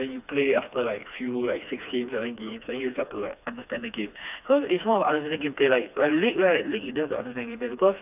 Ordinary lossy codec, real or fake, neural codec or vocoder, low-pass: none; fake; codec, 16 kHz, 2 kbps, FreqCodec, smaller model; 3.6 kHz